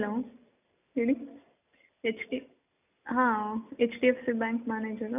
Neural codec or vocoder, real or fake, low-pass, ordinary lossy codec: none; real; 3.6 kHz; none